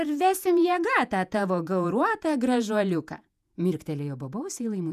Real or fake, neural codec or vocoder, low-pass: fake; vocoder, 48 kHz, 128 mel bands, Vocos; 14.4 kHz